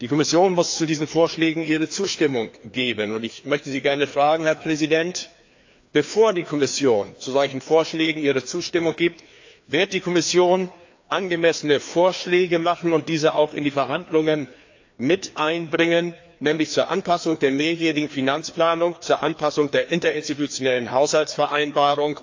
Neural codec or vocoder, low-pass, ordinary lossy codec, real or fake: codec, 16 kHz, 2 kbps, FreqCodec, larger model; 7.2 kHz; AAC, 48 kbps; fake